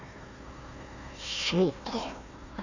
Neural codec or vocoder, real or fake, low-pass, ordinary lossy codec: codec, 16 kHz, 1 kbps, FunCodec, trained on Chinese and English, 50 frames a second; fake; 7.2 kHz; none